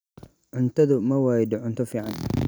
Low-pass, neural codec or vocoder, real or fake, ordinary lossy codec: none; none; real; none